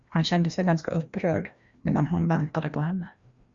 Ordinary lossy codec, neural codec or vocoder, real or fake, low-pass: Opus, 64 kbps; codec, 16 kHz, 1 kbps, FreqCodec, larger model; fake; 7.2 kHz